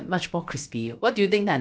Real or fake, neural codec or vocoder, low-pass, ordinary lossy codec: fake; codec, 16 kHz, about 1 kbps, DyCAST, with the encoder's durations; none; none